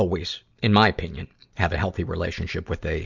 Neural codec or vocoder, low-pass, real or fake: none; 7.2 kHz; real